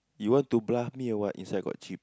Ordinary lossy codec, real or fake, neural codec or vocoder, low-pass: none; real; none; none